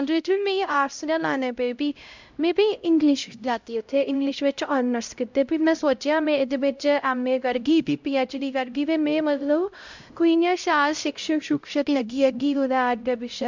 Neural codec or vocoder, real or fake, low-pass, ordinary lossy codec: codec, 16 kHz, 0.5 kbps, X-Codec, HuBERT features, trained on LibriSpeech; fake; 7.2 kHz; MP3, 64 kbps